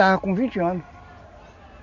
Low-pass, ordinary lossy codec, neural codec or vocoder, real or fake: 7.2 kHz; none; none; real